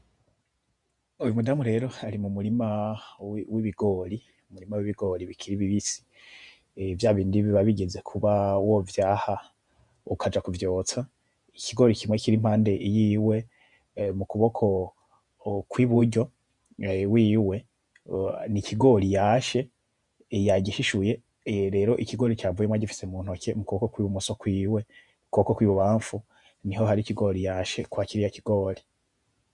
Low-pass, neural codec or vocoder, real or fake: 10.8 kHz; vocoder, 48 kHz, 128 mel bands, Vocos; fake